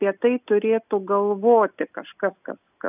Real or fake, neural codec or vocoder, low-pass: real; none; 3.6 kHz